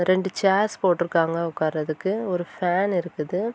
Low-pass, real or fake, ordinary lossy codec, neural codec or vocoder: none; real; none; none